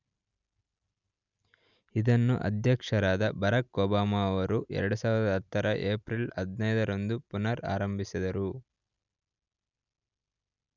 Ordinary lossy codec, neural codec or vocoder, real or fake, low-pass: none; none; real; 7.2 kHz